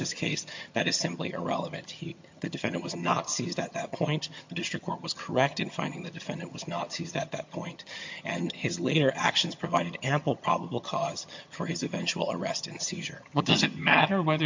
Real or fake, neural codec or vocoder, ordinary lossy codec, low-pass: fake; vocoder, 22.05 kHz, 80 mel bands, HiFi-GAN; MP3, 48 kbps; 7.2 kHz